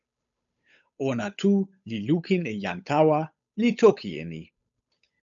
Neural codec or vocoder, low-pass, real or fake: codec, 16 kHz, 8 kbps, FunCodec, trained on Chinese and English, 25 frames a second; 7.2 kHz; fake